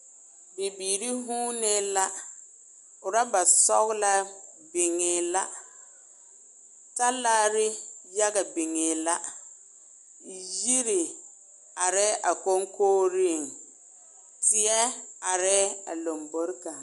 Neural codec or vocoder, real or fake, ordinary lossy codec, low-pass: none; real; AAC, 96 kbps; 10.8 kHz